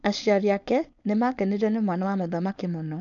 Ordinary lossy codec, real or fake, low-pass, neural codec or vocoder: none; fake; 7.2 kHz; codec, 16 kHz, 4.8 kbps, FACodec